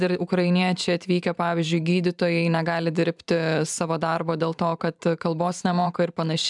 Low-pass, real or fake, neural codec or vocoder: 10.8 kHz; fake; vocoder, 44.1 kHz, 128 mel bands every 512 samples, BigVGAN v2